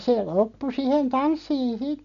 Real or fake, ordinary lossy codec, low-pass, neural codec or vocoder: real; MP3, 96 kbps; 7.2 kHz; none